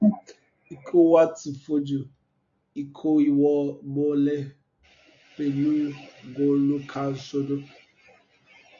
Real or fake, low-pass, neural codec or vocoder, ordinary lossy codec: real; 7.2 kHz; none; AAC, 64 kbps